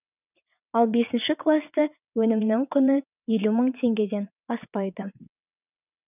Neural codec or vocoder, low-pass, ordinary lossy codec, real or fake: vocoder, 22.05 kHz, 80 mel bands, WaveNeXt; 3.6 kHz; none; fake